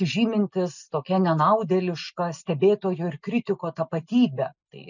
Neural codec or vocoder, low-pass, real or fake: none; 7.2 kHz; real